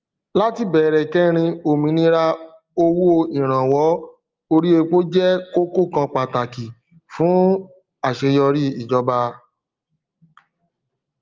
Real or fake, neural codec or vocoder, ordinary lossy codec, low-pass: real; none; Opus, 32 kbps; 7.2 kHz